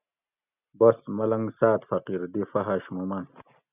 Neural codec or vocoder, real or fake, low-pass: none; real; 3.6 kHz